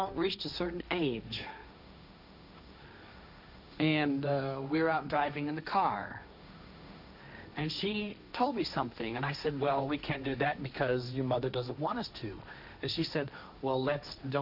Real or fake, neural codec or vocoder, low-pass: fake; codec, 16 kHz, 1.1 kbps, Voila-Tokenizer; 5.4 kHz